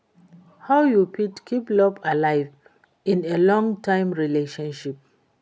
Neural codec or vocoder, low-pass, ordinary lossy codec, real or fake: none; none; none; real